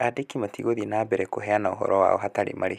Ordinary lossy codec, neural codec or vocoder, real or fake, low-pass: none; none; real; 14.4 kHz